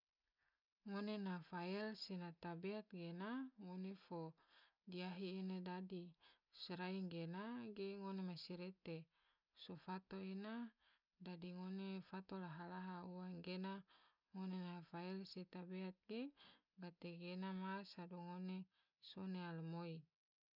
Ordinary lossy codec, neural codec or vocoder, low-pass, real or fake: none; none; 5.4 kHz; real